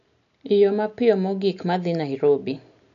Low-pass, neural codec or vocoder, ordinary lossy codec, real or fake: 7.2 kHz; none; none; real